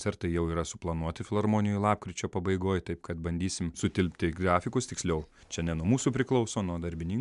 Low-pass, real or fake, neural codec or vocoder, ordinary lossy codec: 10.8 kHz; real; none; MP3, 96 kbps